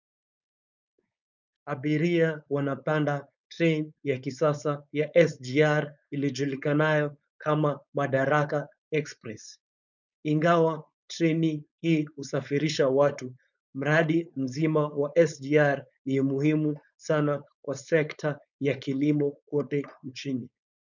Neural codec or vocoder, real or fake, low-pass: codec, 16 kHz, 4.8 kbps, FACodec; fake; 7.2 kHz